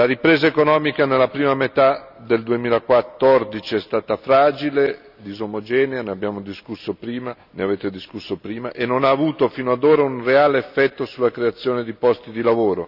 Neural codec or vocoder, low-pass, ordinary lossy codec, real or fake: none; 5.4 kHz; none; real